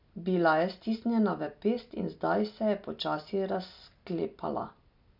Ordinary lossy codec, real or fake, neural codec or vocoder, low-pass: none; real; none; 5.4 kHz